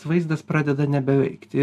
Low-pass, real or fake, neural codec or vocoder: 14.4 kHz; real; none